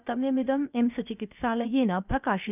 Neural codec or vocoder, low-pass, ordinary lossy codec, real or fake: codec, 16 kHz, 0.7 kbps, FocalCodec; 3.6 kHz; none; fake